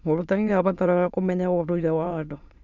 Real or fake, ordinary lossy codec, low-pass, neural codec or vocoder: fake; none; 7.2 kHz; autoencoder, 22.05 kHz, a latent of 192 numbers a frame, VITS, trained on many speakers